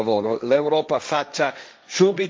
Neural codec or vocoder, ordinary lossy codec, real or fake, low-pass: codec, 16 kHz, 1.1 kbps, Voila-Tokenizer; none; fake; none